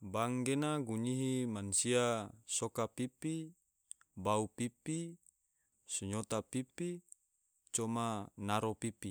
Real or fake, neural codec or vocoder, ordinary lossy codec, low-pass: real; none; none; none